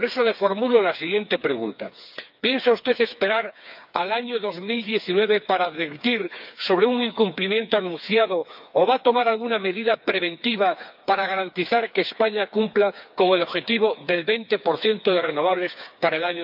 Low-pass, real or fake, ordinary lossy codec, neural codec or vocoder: 5.4 kHz; fake; none; codec, 16 kHz, 4 kbps, FreqCodec, smaller model